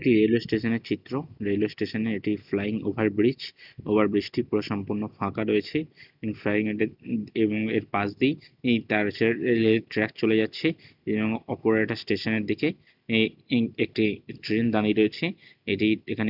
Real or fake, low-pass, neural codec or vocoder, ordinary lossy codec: real; 5.4 kHz; none; none